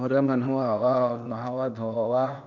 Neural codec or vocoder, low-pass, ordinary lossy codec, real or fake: codec, 16 kHz, 0.8 kbps, ZipCodec; 7.2 kHz; none; fake